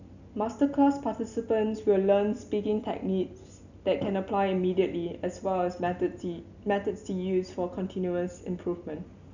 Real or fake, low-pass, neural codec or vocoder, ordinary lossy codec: real; 7.2 kHz; none; none